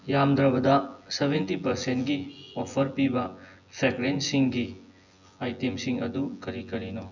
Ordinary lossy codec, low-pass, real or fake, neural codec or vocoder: none; 7.2 kHz; fake; vocoder, 24 kHz, 100 mel bands, Vocos